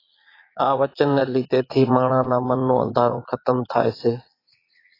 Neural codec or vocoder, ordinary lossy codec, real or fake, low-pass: vocoder, 22.05 kHz, 80 mel bands, Vocos; AAC, 24 kbps; fake; 5.4 kHz